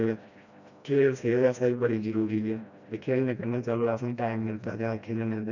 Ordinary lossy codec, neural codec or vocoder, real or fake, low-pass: none; codec, 16 kHz, 1 kbps, FreqCodec, smaller model; fake; 7.2 kHz